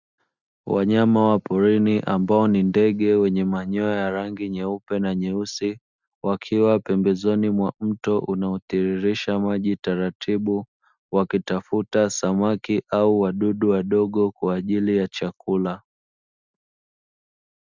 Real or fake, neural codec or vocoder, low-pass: real; none; 7.2 kHz